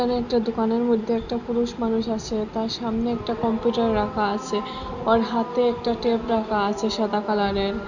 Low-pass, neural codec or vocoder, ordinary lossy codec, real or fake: 7.2 kHz; none; none; real